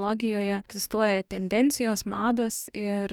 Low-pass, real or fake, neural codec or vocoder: 19.8 kHz; fake; codec, 44.1 kHz, 2.6 kbps, DAC